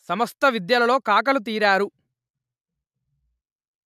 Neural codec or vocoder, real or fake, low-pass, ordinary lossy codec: none; real; 14.4 kHz; none